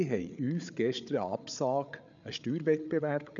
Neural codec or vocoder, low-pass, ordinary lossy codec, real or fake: codec, 16 kHz, 8 kbps, FreqCodec, larger model; 7.2 kHz; none; fake